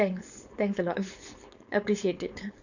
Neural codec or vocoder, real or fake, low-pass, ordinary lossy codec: codec, 16 kHz, 4.8 kbps, FACodec; fake; 7.2 kHz; none